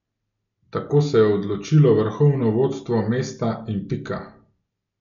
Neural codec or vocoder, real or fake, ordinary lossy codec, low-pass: none; real; none; 7.2 kHz